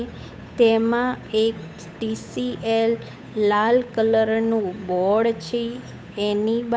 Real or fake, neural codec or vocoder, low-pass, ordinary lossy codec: fake; codec, 16 kHz, 8 kbps, FunCodec, trained on Chinese and English, 25 frames a second; none; none